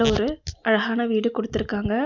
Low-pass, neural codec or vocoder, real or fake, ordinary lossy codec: 7.2 kHz; none; real; none